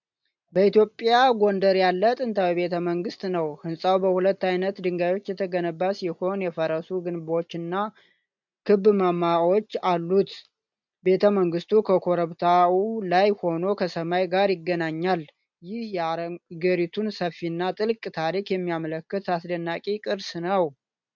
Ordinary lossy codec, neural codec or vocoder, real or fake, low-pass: MP3, 64 kbps; none; real; 7.2 kHz